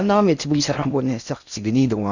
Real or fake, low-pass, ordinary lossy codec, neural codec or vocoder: fake; 7.2 kHz; none; codec, 16 kHz in and 24 kHz out, 0.6 kbps, FocalCodec, streaming, 4096 codes